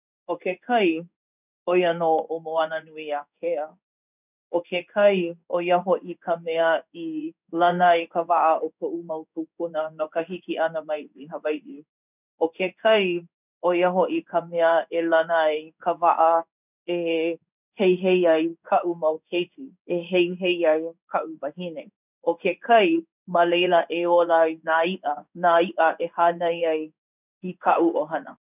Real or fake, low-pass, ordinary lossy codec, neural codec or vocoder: fake; 3.6 kHz; none; codec, 16 kHz in and 24 kHz out, 1 kbps, XY-Tokenizer